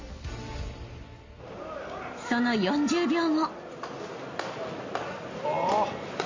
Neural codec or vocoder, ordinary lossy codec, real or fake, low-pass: none; MP3, 32 kbps; real; 7.2 kHz